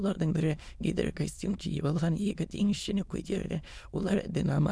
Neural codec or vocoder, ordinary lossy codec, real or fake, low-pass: autoencoder, 22.05 kHz, a latent of 192 numbers a frame, VITS, trained on many speakers; none; fake; none